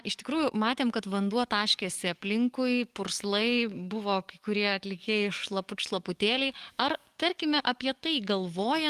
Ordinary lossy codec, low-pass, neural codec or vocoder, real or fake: Opus, 32 kbps; 14.4 kHz; codec, 44.1 kHz, 7.8 kbps, DAC; fake